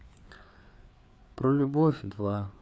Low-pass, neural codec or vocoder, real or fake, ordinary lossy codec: none; codec, 16 kHz, 4 kbps, FreqCodec, larger model; fake; none